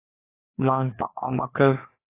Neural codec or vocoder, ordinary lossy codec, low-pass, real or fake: codec, 16 kHz, 2 kbps, FreqCodec, larger model; AAC, 24 kbps; 3.6 kHz; fake